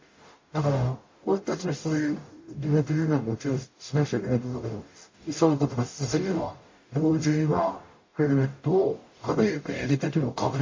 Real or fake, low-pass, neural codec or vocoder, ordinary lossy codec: fake; 7.2 kHz; codec, 44.1 kHz, 0.9 kbps, DAC; MP3, 32 kbps